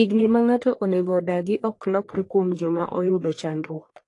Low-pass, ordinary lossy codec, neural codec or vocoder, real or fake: 10.8 kHz; AAC, 48 kbps; codec, 44.1 kHz, 1.7 kbps, Pupu-Codec; fake